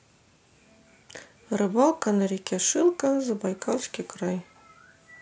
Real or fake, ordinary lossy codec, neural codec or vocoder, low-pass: real; none; none; none